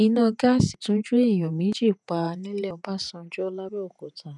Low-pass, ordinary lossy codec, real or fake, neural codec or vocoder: 10.8 kHz; none; fake; vocoder, 48 kHz, 128 mel bands, Vocos